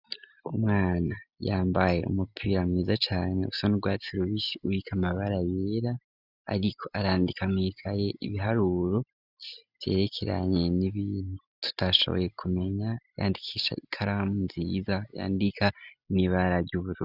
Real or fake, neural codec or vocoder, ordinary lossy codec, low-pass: real; none; Opus, 64 kbps; 5.4 kHz